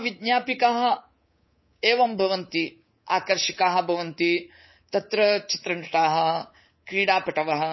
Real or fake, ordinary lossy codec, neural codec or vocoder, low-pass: fake; MP3, 24 kbps; codec, 24 kHz, 3.1 kbps, DualCodec; 7.2 kHz